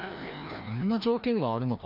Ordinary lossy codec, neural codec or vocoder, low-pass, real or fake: MP3, 48 kbps; codec, 16 kHz, 1 kbps, FreqCodec, larger model; 5.4 kHz; fake